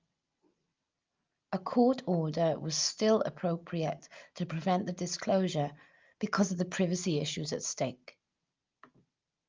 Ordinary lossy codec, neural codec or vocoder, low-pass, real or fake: Opus, 24 kbps; none; 7.2 kHz; real